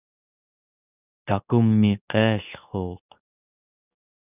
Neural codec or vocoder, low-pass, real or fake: codec, 24 kHz, 0.9 kbps, WavTokenizer, medium speech release version 2; 3.6 kHz; fake